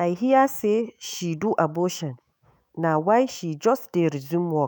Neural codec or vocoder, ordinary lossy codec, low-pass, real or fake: autoencoder, 48 kHz, 128 numbers a frame, DAC-VAE, trained on Japanese speech; none; none; fake